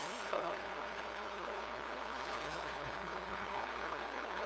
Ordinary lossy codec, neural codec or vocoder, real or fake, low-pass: none; codec, 16 kHz, 2 kbps, FunCodec, trained on LibriTTS, 25 frames a second; fake; none